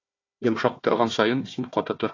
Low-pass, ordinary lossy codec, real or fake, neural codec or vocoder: 7.2 kHz; AAC, 32 kbps; fake; codec, 16 kHz, 1 kbps, FunCodec, trained on Chinese and English, 50 frames a second